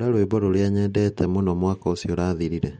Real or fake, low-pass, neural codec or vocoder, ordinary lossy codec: real; 9.9 kHz; none; MP3, 48 kbps